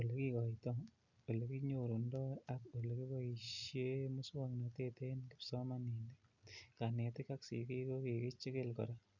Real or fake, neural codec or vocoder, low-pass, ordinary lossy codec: real; none; 7.2 kHz; none